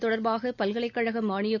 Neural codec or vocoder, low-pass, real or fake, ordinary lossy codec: none; 7.2 kHz; real; none